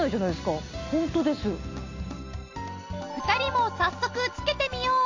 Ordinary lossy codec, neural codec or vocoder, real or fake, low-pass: AAC, 48 kbps; none; real; 7.2 kHz